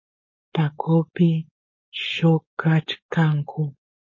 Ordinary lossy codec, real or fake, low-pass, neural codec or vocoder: MP3, 32 kbps; fake; 7.2 kHz; codec, 16 kHz, 4.8 kbps, FACodec